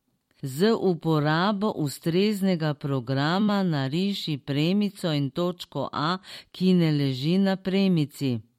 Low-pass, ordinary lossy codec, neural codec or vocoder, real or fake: 19.8 kHz; MP3, 64 kbps; vocoder, 44.1 kHz, 128 mel bands every 256 samples, BigVGAN v2; fake